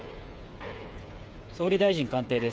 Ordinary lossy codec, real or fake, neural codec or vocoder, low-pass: none; fake; codec, 16 kHz, 8 kbps, FreqCodec, smaller model; none